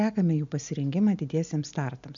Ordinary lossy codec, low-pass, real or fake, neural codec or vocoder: MP3, 64 kbps; 7.2 kHz; real; none